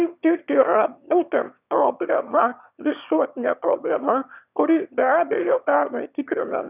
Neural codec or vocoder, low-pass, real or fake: autoencoder, 22.05 kHz, a latent of 192 numbers a frame, VITS, trained on one speaker; 3.6 kHz; fake